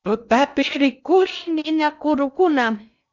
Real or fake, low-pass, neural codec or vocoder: fake; 7.2 kHz; codec, 16 kHz in and 24 kHz out, 0.6 kbps, FocalCodec, streaming, 2048 codes